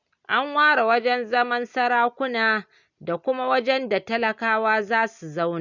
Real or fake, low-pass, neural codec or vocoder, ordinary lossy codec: real; 7.2 kHz; none; none